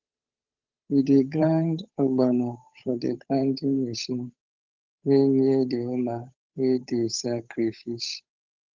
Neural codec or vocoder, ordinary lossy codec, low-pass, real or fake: codec, 16 kHz, 8 kbps, FunCodec, trained on Chinese and English, 25 frames a second; Opus, 32 kbps; 7.2 kHz; fake